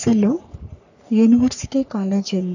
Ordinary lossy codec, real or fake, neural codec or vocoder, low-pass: none; fake; codec, 44.1 kHz, 3.4 kbps, Pupu-Codec; 7.2 kHz